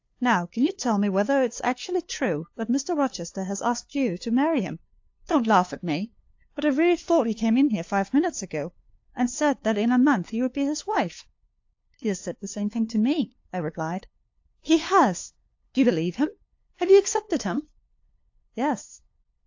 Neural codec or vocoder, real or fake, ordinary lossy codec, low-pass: codec, 16 kHz, 2 kbps, FunCodec, trained on LibriTTS, 25 frames a second; fake; AAC, 48 kbps; 7.2 kHz